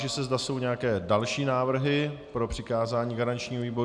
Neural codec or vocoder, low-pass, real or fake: none; 9.9 kHz; real